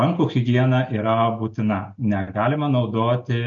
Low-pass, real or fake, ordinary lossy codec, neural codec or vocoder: 7.2 kHz; real; MP3, 48 kbps; none